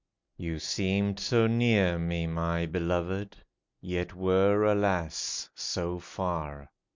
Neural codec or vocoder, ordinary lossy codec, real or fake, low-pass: none; MP3, 64 kbps; real; 7.2 kHz